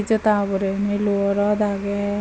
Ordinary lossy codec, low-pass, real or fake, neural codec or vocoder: none; none; real; none